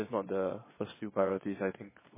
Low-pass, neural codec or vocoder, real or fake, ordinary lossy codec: 3.6 kHz; vocoder, 22.05 kHz, 80 mel bands, Vocos; fake; MP3, 16 kbps